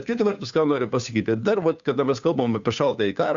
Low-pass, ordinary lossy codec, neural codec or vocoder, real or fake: 7.2 kHz; Opus, 64 kbps; codec, 16 kHz, 2 kbps, FunCodec, trained on Chinese and English, 25 frames a second; fake